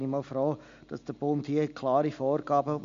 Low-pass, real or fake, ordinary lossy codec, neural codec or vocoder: 7.2 kHz; real; MP3, 64 kbps; none